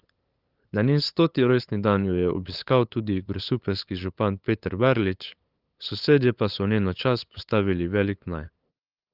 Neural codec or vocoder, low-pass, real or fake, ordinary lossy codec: codec, 16 kHz, 8 kbps, FunCodec, trained on LibriTTS, 25 frames a second; 5.4 kHz; fake; Opus, 32 kbps